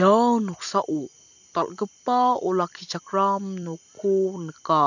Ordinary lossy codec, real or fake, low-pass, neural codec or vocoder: none; fake; 7.2 kHz; vocoder, 44.1 kHz, 128 mel bands every 256 samples, BigVGAN v2